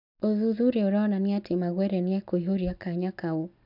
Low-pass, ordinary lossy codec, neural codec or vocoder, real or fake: 5.4 kHz; none; none; real